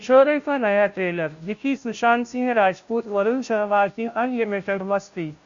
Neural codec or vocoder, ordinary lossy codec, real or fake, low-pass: codec, 16 kHz, 0.5 kbps, FunCodec, trained on Chinese and English, 25 frames a second; Opus, 64 kbps; fake; 7.2 kHz